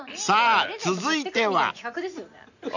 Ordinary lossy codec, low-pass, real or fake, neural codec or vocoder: none; 7.2 kHz; real; none